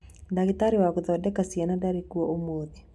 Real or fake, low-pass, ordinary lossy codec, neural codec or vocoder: real; none; none; none